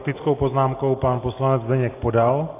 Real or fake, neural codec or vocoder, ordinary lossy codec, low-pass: real; none; AAC, 24 kbps; 3.6 kHz